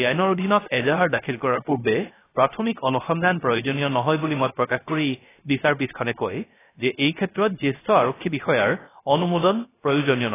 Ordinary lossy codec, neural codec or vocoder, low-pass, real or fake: AAC, 16 kbps; codec, 16 kHz, 0.3 kbps, FocalCodec; 3.6 kHz; fake